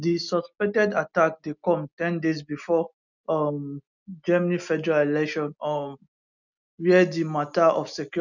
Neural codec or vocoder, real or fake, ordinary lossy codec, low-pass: none; real; none; 7.2 kHz